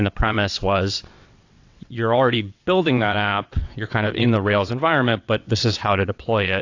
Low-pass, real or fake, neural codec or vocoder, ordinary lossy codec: 7.2 kHz; fake; vocoder, 22.05 kHz, 80 mel bands, WaveNeXt; AAC, 48 kbps